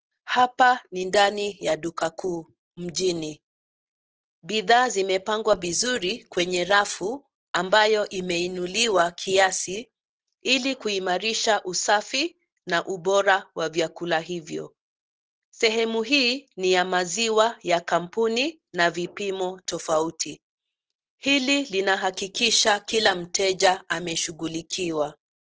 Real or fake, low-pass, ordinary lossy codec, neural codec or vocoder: real; 7.2 kHz; Opus, 16 kbps; none